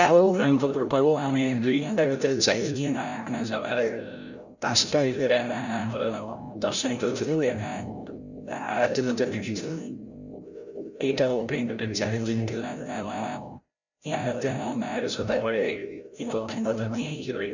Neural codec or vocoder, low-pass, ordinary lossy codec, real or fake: codec, 16 kHz, 0.5 kbps, FreqCodec, larger model; 7.2 kHz; none; fake